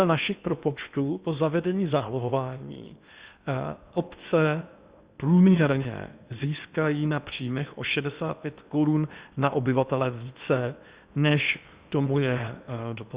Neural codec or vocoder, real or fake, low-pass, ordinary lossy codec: codec, 16 kHz in and 24 kHz out, 0.8 kbps, FocalCodec, streaming, 65536 codes; fake; 3.6 kHz; Opus, 64 kbps